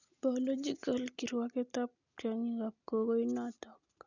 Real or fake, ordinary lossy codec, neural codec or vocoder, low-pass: real; none; none; 7.2 kHz